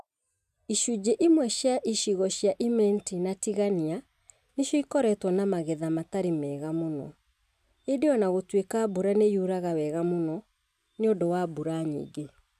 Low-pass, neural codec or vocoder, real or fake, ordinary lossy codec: 14.4 kHz; none; real; none